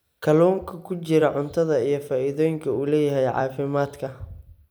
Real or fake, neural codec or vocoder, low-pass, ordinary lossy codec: fake; vocoder, 44.1 kHz, 128 mel bands every 256 samples, BigVGAN v2; none; none